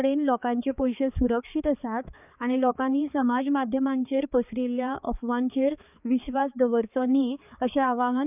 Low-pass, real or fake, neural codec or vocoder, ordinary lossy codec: 3.6 kHz; fake; codec, 16 kHz, 4 kbps, X-Codec, HuBERT features, trained on balanced general audio; none